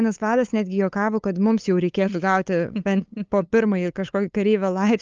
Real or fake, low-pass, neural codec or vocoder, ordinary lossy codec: fake; 7.2 kHz; codec, 16 kHz, 8 kbps, FunCodec, trained on LibriTTS, 25 frames a second; Opus, 24 kbps